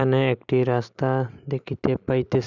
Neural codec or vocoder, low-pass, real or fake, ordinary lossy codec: none; 7.2 kHz; real; none